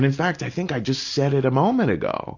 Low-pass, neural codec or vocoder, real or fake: 7.2 kHz; none; real